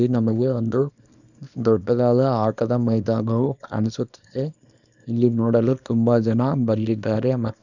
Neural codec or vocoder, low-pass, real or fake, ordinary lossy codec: codec, 24 kHz, 0.9 kbps, WavTokenizer, small release; 7.2 kHz; fake; none